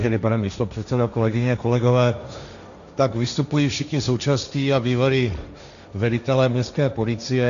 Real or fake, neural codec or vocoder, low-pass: fake; codec, 16 kHz, 1.1 kbps, Voila-Tokenizer; 7.2 kHz